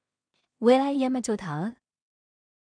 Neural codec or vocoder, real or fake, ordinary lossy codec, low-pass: codec, 16 kHz in and 24 kHz out, 0.4 kbps, LongCat-Audio-Codec, two codebook decoder; fake; MP3, 96 kbps; 9.9 kHz